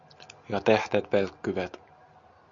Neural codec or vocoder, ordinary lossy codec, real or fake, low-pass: none; Opus, 64 kbps; real; 7.2 kHz